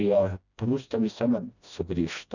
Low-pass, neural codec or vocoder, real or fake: 7.2 kHz; codec, 16 kHz, 1 kbps, FreqCodec, smaller model; fake